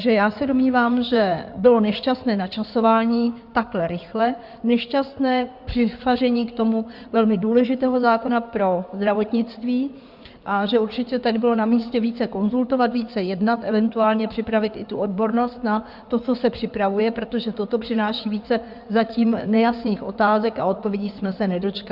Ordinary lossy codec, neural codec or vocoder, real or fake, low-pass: Opus, 64 kbps; codec, 16 kHz in and 24 kHz out, 2.2 kbps, FireRedTTS-2 codec; fake; 5.4 kHz